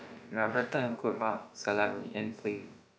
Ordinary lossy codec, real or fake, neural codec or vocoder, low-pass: none; fake; codec, 16 kHz, about 1 kbps, DyCAST, with the encoder's durations; none